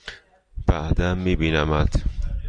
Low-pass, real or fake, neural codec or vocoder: 9.9 kHz; real; none